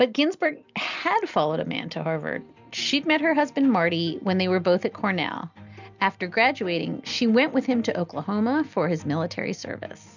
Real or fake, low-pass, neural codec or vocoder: real; 7.2 kHz; none